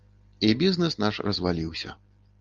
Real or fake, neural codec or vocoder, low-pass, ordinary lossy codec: real; none; 7.2 kHz; Opus, 32 kbps